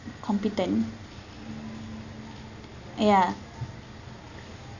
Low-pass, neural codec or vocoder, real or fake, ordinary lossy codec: 7.2 kHz; none; real; none